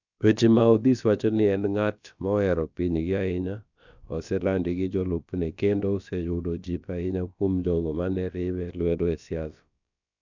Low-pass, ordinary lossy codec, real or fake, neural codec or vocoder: 7.2 kHz; none; fake; codec, 16 kHz, about 1 kbps, DyCAST, with the encoder's durations